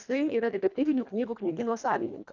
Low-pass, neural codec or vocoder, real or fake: 7.2 kHz; codec, 24 kHz, 1.5 kbps, HILCodec; fake